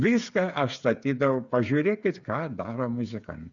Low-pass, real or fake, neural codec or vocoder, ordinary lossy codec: 7.2 kHz; fake; codec, 16 kHz, 8 kbps, FreqCodec, smaller model; Opus, 64 kbps